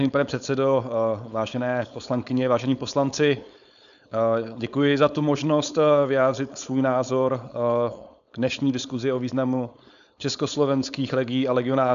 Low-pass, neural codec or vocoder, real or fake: 7.2 kHz; codec, 16 kHz, 4.8 kbps, FACodec; fake